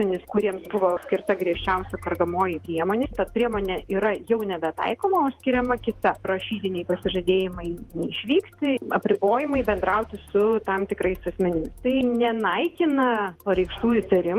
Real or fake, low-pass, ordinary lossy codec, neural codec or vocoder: fake; 14.4 kHz; Opus, 24 kbps; vocoder, 48 kHz, 128 mel bands, Vocos